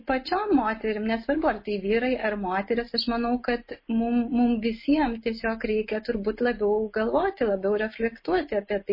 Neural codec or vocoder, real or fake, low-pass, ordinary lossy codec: none; real; 5.4 kHz; MP3, 24 kbps